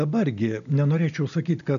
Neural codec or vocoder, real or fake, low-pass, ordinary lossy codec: none; real; 7.2 kHz; MP3, 96 kbps